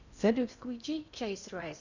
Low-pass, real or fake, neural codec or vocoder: 7.2 kHz; fake; codec, 16 kHz in and 24 kHz out, 0.6 kbps, FocalCodec, streaming, 2048 codes